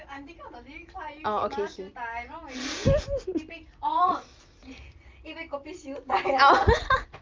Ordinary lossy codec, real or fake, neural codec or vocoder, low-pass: Opus, 24 kbps; real; none; 7.2 kHz